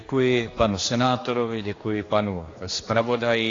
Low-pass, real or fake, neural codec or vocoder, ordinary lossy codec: 7.2 kHz; fake; codec, 16 kHz, 2 kbps, X-Codec, HuBERT features, trained on general audio; AAC, 32 kbps